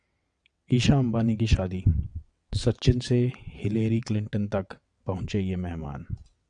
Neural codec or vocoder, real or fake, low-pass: vocoder, 22.05 kHz, 80 mel bands, WaveNeXt; fake; 9.9 kHz